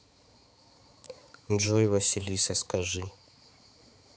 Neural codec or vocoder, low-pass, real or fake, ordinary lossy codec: codec, 16 kHz, 4 kbps, X-Codec, HuBERT features, trained on balanced general audio; none; fake; none